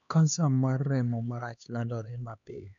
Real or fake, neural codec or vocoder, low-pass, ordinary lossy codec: fake; codec, 16 kHz, 2 kbps, X-Codec, HuBERT features, trained on LibriSpeech; 7.2 kHz; none